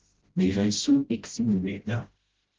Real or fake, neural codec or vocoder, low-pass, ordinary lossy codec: fake; codec, 16 kHz, 0.5 kbps, FreqCodec, smaller model; 7.2 kHz; Opus, 16 kbps